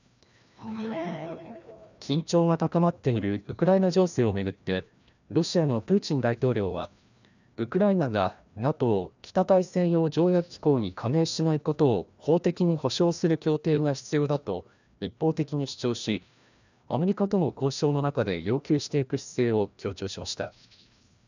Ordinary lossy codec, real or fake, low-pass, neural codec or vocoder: none; fake; 7.2 kHz; codec, 16 kHz, 1 kbps, FreqCodec, larger model